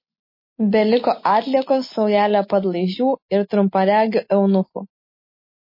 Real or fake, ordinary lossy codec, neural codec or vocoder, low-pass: real; MP3, 24 kbps; none; 5.4 kHz